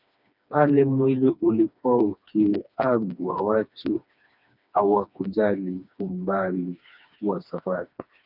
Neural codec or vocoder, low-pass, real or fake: codec, 16 kHz, 2 kbps, FreqCodec, smaller model; 5.4 kHz; fake